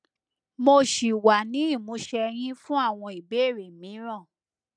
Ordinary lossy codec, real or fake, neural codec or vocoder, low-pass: none; real; none; 9.9 kHz